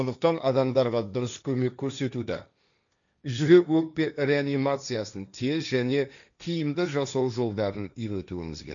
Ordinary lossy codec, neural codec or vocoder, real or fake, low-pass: none; codec, 16 kHz, 1.1 kbps, Voila-Tokenizer; fake; 7.2 kHz